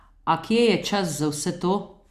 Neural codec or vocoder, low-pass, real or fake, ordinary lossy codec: none; 14.4 kHz; real; Opus, 64 kbps